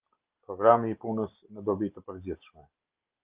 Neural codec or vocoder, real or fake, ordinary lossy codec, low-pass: none; real; Opus, 24 kbps; 3.6 kHz